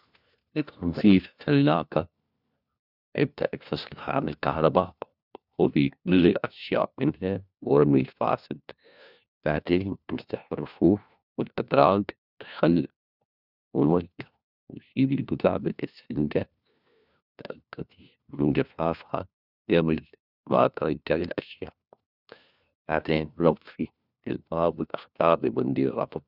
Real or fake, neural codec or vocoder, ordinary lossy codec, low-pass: fake; codec, 16 kHz, 1 kbps, FunCodec, trained on LibriTTS, 50 frames a second; none; 5.4 kHz